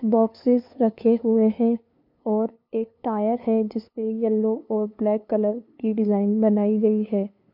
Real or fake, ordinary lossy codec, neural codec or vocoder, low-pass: fake; MP3, 48 kbps; codec, 16 kHz, 2 kbps, FunCodec, trained on LibriTTS, 25 frames a second; 5.4 kHz